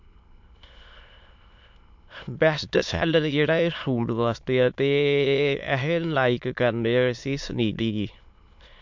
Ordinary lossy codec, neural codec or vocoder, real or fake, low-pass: MP3, 64 kbps; autoencoder, 22.05 kHz, a latent of 192 numbers a frame, VITS, trained on many speakers; fake; 7.2 kHz